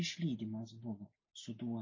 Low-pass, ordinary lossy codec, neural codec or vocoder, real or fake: 7.2 kHz; MP3, 32 kbps; none; real